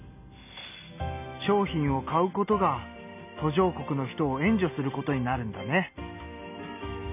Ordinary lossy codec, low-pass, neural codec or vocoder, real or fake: none; 3.6 kHz; none; real